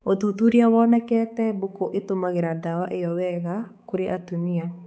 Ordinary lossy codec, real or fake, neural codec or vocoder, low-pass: none; fake; codec, 16 kHz, 4 kbps, X-Codec, HuBERT features, trained on balanced general audio; none